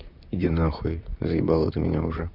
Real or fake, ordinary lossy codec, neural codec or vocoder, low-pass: fake; none; vocoder, 44.1 kHz, 128 mel bands, Pupu-Vocoder; 5.4 kHz